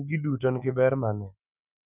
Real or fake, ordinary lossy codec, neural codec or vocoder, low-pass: fake; none; autoencoder, 48 kHz, 128 numbers a frame, DAC-VAE, trained on Japanese speech; 3.6 kHz